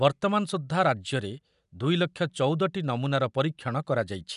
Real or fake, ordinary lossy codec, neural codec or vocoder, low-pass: real; none; none; 10.8 kHz